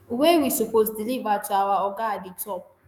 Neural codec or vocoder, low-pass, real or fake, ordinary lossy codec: autoencoder, 48 kHz, 128 numbers a frame, DAC-VAE, trained on Japanese speech; none; fake; none